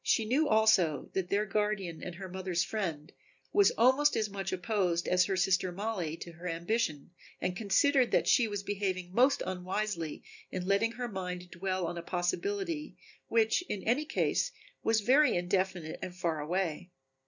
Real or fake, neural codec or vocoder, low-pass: real; none; 7.2 kHz